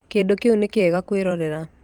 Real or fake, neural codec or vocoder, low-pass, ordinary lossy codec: fake; vocoder, 44.1 kHz, 128 mel bands every 256 samples, BigVGAN v2; 19.8 kHz; Opus, 32 kbps